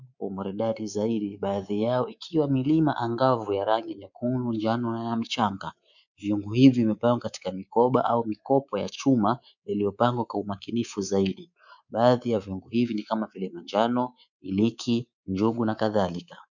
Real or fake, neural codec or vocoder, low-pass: fake; codec, 24 kHz, 3.1 kbps, DualCodec; 7.2 kHz